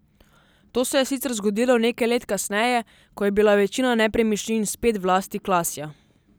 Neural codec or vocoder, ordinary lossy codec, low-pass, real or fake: none; none; none; real